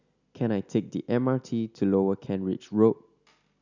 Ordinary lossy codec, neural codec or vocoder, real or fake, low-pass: none; none; real; 7.2 kHz